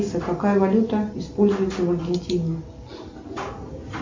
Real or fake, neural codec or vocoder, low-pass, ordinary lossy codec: real; none; 7.2 kHz; MP3, 48 kbps